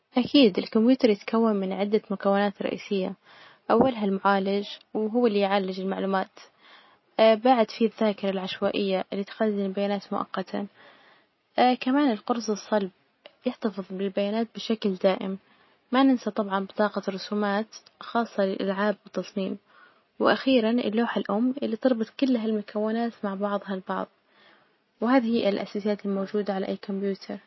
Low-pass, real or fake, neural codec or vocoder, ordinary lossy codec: 7.2 kHz; real; none; MP3, 24 kbps